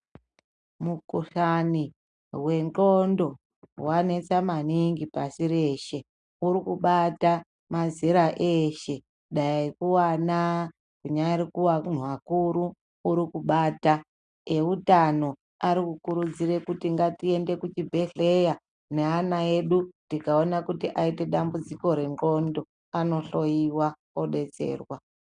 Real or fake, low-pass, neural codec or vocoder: real; 9.9 kHz; none